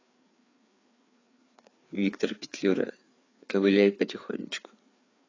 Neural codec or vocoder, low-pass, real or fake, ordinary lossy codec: codec, 16 kHz, 4 kbps, FreqCodec, larger model; 7.2 kHz; fake; AAC, 32 kbps